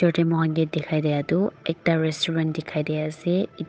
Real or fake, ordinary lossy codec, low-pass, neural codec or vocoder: fake; none; none; codec, 16 kHz, 8 kbps, FunCodec, trained on Chinese and English, 25 frames a second